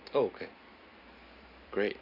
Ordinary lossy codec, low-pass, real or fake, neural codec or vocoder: none; 5.4 kHz; fake; vocoder, 44.1 kHz, 128 mel bands every 512 samples, BigVGAN v2